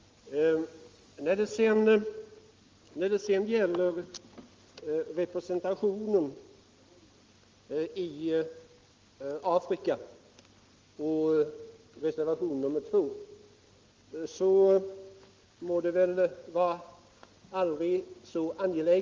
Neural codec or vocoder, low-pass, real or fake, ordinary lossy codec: none; 7.2 kHz; real; Opus, 32 kbps